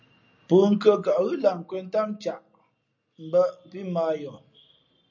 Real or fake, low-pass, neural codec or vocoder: real; 7.2 kHz; none